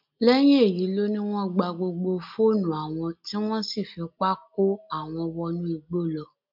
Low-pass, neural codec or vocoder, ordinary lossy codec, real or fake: 5.4 kHz; none; MP3, 48 kbps; real